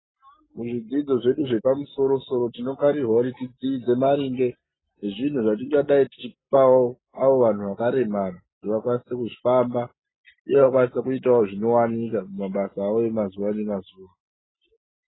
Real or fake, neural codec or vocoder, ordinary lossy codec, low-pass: real; none; AAC, 16 kbps; 7.2 kHz